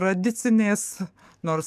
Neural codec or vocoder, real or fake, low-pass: codec, 44.1 kHz, 7.8 kbps, DAC; fake; 14.4 kHz